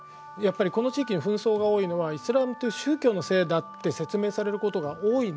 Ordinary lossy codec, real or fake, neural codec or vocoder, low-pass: none; real; none; none